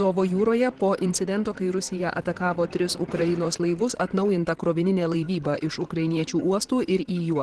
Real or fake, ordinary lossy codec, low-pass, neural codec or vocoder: fake; Opus, 16 kbps; 10.8 kHz; vocoder, 44.1 kHz, 128 mel bands, Pupu-Vocoder